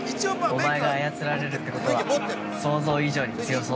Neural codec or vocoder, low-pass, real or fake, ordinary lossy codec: none; none; real; none